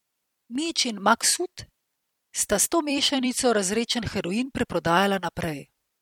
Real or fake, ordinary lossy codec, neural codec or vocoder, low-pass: fake; MP3, 96 kbps; vocoder, 44.1 kHz, 128 mel bands every 256 samples, BigVGAN v2; 19.8 kHz